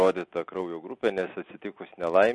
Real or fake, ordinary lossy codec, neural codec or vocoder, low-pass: real; MP3, 48 kbps; none; 10.8 kHz